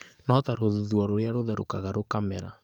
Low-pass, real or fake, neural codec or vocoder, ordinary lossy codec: 19.8 kHz; fake; codec, 44.1 kHz, 7.8 kbps, DAC; none